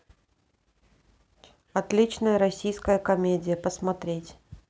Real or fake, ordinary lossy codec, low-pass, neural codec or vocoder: real; none; none; none